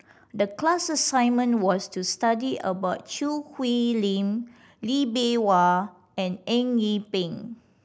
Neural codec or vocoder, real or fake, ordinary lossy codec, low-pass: none; real; none; none